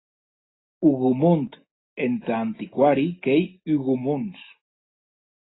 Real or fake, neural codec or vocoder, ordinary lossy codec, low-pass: real; none; AAC, 16 kbps; 7.2 kHz